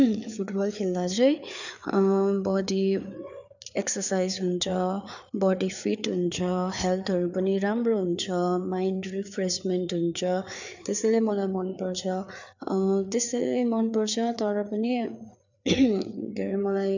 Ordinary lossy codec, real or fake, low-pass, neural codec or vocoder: none; fake; 7.2 kHz; codec, 16 kHz, 4 kbps, FreqCodec, larger model